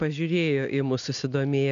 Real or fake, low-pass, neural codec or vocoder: real; 7.2 kHz; none